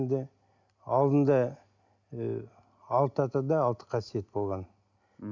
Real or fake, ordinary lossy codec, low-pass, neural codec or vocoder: real; none; 7.2 kHz; none